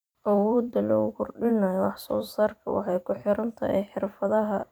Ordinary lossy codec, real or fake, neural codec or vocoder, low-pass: none; fake; vocoder, 44.1 kHz, 128 mel bands every 512 samples, BigVGAN v2; none